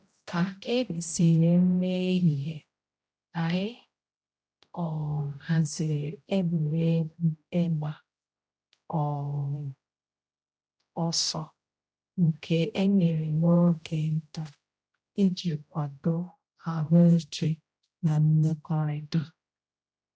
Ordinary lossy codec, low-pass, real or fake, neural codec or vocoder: none; none; fake; codec, 16 kHz, 0.5 kbps, X-Codec, HuBERT features, trained on general audio